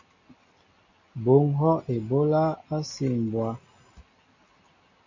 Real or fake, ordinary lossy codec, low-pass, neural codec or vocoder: real; MP3, 32 kbps; 7.2 kHz; none